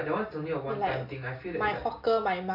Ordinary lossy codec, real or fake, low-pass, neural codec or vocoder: none; real; 5.4 kHz; none